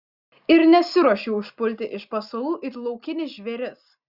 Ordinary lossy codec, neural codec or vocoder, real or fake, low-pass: Opus, 64 kbps; none; real; 5.4 kHz